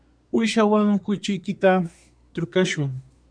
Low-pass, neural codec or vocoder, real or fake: 9.9 kHz; codec, 24 kHz, 1 kbps, SNAC; fake